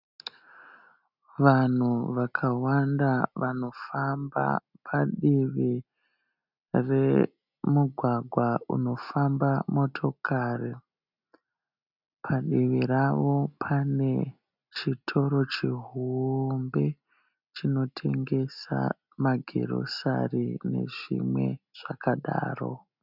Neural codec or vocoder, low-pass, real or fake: none; 5.4 kHz; real